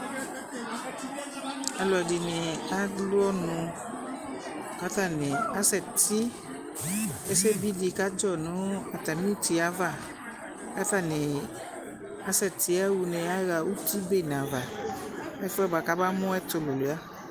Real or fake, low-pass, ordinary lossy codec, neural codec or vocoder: real; 14.4 kHz; Opus, 32 kbps; none